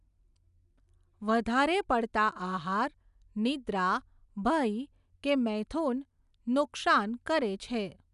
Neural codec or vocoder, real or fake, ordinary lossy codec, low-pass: none; real; none; 10.8 kHz